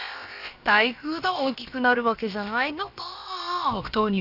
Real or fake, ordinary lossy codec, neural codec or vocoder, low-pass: fake; none; codec, 16 kHz, about 1 kbps, DyCAST, with the encoder's durations; 5.4 kHz